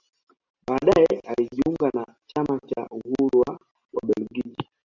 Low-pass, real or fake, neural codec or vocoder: 7.2 kHz; real; none